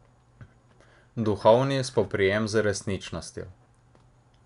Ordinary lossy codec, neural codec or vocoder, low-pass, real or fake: none; none; 10.8 kHz; real